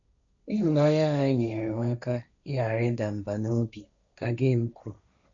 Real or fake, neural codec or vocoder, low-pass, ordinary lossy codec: fake; codec, 16 kHz, 1.1 kbps, Voila-Tokenizer; 7.2 kHz; none